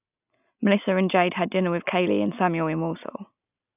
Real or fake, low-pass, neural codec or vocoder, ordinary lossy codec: real; 3.6 kHz; none; none